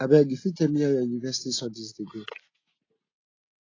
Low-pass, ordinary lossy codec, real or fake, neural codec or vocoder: 7.2 kHz; AAC, 32 kbps; real; none